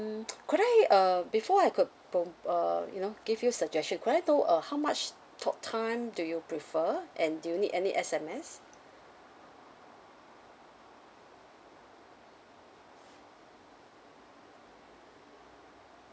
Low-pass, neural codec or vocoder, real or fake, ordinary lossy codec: none; none; real; none